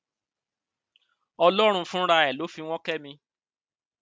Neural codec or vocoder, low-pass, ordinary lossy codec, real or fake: none; none; none; real